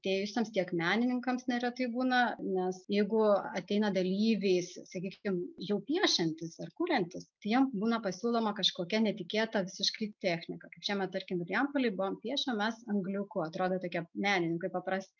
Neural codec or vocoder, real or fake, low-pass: none; real; 7.2 kHz